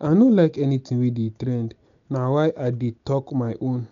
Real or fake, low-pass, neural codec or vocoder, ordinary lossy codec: real; 7.2 kHz; none; none